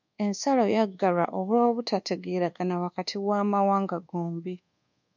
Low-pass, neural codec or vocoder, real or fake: 7.2 kHz; codec, 24 kHz, 1.2 kbps, DualCodec; fake